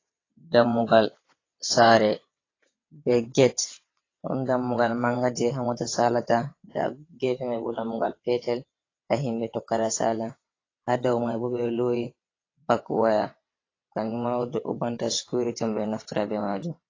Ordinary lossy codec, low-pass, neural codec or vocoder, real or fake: AAC, 32 kbps; 7.2 kHz; vocoder, 22.05 kHz, 80 mel bands, WaveNeXt; fake